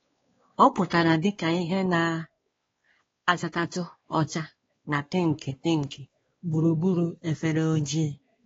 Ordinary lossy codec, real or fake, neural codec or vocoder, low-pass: AAC, 24 kbps; fake; codec, 16 kHz, 2 kbps, X-Codec, WavLM features, trained on Multilingual LibriSpeech; 7.2 kHz